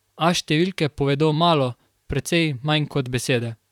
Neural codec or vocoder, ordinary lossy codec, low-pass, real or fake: vocoder, 44.1 kHz, 128 mel bands, Pupu-Vocoder; none; 19.8 kHz; fake